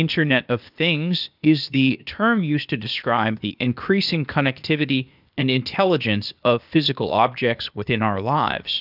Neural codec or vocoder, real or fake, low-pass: codec, 16 kHz, 0.8 kbps, ZipCodec; fake; 5.4 kHz